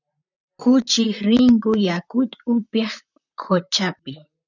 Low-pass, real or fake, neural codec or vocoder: 7.2 kHz; fake; vocoder, 44.1 kHz, 128 mel bands, Pupu-Vocoder